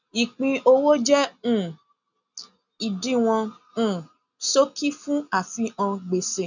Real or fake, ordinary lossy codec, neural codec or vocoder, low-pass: real; none; none; 7.2 kHz